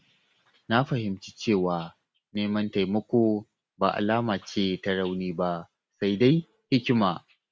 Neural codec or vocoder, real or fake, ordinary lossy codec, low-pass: none; real; none; none